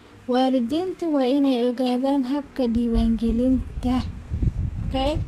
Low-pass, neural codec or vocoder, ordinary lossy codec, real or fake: 14.4 kHz; codec, 32 kHz, 1.9 kbps, SNAC; MP3, 96 kbps; fake